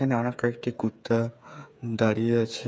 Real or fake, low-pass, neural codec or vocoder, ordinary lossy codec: fake; none; codec, 16 kHz, 8 kbps, FreqCodec, smaller model; none